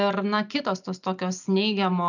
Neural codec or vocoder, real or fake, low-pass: none; real; 7.2 kHz